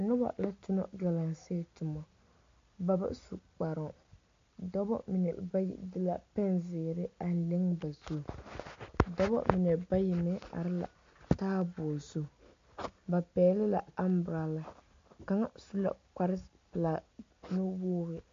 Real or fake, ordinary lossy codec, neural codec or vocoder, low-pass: real; MP3, 48 kbps; none; 7.2 kHz